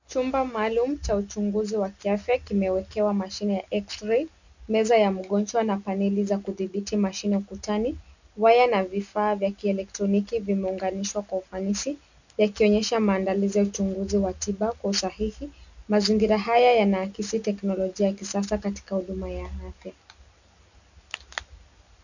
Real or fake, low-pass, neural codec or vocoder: real; 7.2 kHz; none